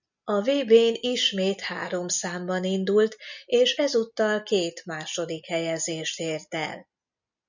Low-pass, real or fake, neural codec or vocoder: 7.2 kHz; real; none